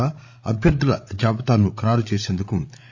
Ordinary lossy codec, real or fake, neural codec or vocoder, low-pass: none; fake; codec, 16 kHz, 16 kbps, FreqCodec, larger model; none